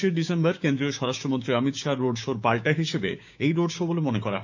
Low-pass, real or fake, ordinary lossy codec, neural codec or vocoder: 7.2 kHz; fake; none; codec, 16 kHz, 6 kbps, DAC